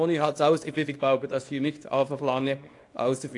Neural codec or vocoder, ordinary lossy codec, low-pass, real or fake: codec, 24 kHz, 0.9 kbps, WavTokenizer, small release; AAC, 48 kbps; 10.8 kHz; fake